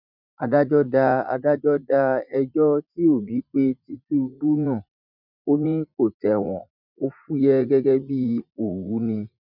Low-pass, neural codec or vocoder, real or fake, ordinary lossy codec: 5.4 kHz; vocoder, 44.1 kHz, 80 mel bands, Vocos; fake; none